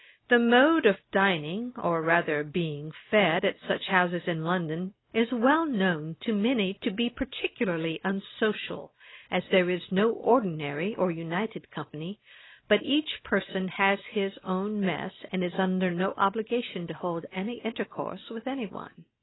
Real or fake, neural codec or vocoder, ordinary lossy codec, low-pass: real; none; AAC, 16 kbps; 7.2 kHz